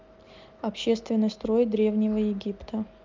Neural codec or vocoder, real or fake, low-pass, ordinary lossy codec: none; real; 7.2 kHz; Opus, 24 kbps